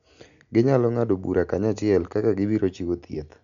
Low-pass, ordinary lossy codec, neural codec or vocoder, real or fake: 7.2 kHz; none; none; real